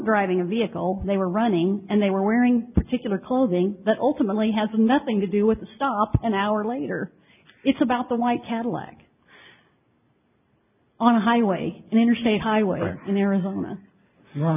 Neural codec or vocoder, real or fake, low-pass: none; real; 3.6 kHz